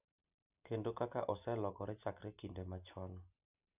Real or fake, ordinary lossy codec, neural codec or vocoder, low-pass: real; none; none; 3.6 kHz